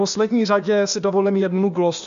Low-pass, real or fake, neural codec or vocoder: 7.2 kHz; fake; codec, 16 kHz, 0.8 kbps, ZipCodec